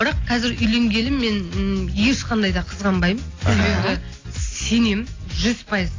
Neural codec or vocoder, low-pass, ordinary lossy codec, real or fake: none; 7.2 kHz; AAC, 32 kbps; real